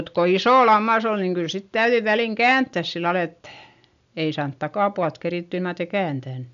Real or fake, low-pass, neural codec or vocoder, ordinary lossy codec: real; 7.2 kHz; none; none